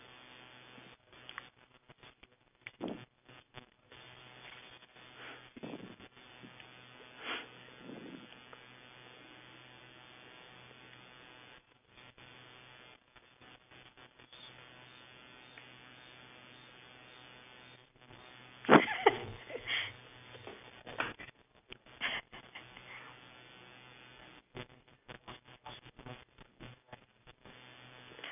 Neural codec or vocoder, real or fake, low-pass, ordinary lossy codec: none; real; 3.6 kHz; none